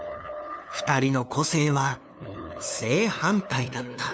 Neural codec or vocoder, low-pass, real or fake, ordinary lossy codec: codec, 16 kHz, 2 kbps, FunCodec, trained on LibriTTS, 25 frames a second; none; fake; none